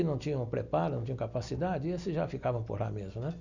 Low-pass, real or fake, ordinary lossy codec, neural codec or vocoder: 7.2 kHz; real; none; none